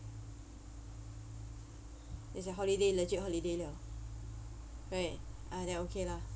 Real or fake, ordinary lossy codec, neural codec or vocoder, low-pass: real; none; none; none